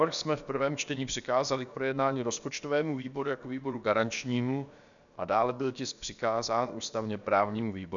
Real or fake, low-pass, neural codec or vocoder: fake; 7.2 kHz; codec, 16 kHz, about 1 kbps, DyCAST, with the encoder's durations